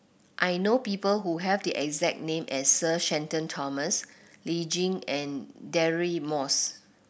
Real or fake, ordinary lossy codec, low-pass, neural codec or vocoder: real; none; none; none